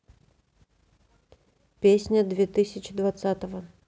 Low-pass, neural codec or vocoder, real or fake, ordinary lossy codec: none; none; real; none